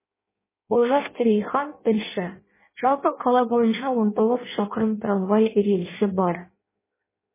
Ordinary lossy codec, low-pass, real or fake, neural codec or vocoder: MP3, 16 kbps; 3.6 kHz; fake; codec, 16 kHz in and 24 kHz out, 0.6 kbps, FireRedTTS-2 codec